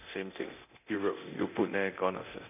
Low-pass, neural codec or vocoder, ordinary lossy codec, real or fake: 3.6 kHz; codec, 24 kHz, 0.9 kbps, DualCodec; none; fake